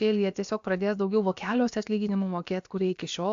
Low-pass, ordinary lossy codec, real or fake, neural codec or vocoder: 7.2 kHz; AAC, 64 kbps; fake; codec, 16 kHz, about 1 kbps, DyCAST, with the encoder's durations